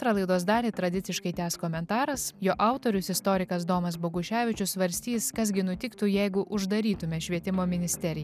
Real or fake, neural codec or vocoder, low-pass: real; none; 14.4 kHz